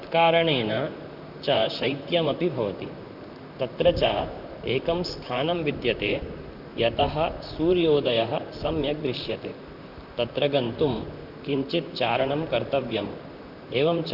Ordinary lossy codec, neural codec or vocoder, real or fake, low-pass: none; vocoder, 44.1 kHz, 128 mel bands, Pupu-Vocoder; fake; 5.4 kHz